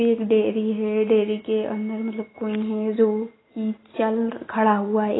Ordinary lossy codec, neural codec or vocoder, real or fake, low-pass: AAC, 16 kbps; none; real; 7.2 kHz